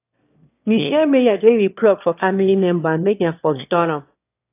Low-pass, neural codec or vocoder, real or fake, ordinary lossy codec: 3.6 kHz; autoencoder, 22.05 kHz, a latent of 192 numbers a frame, VITS, trained on one speaker; fake; AAC, 24 kbps